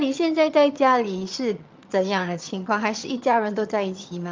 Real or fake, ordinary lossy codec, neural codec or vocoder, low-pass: fake; Opus, 24 kbps; vocoder, 22.05 kHz, 80 mel bands, HiFi-GAN; 7.2 kHz